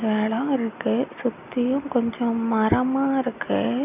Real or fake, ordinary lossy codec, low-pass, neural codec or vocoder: real; none; 3.6 kHz; none